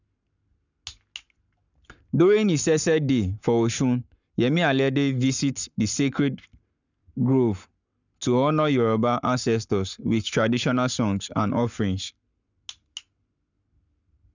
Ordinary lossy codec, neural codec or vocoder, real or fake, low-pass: none; codec, 44.1 kHz, 7.8 kbps, Pupu-Codec; fake; 7.2 kHz